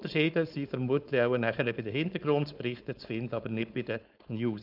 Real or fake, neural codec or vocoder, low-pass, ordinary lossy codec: fake; codec, 16 kHz, 4.8 kbps, FACodec; 5.4 kHz; none